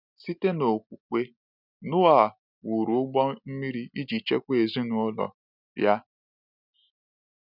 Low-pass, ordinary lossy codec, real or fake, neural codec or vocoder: 5.4 kHz; none; real; none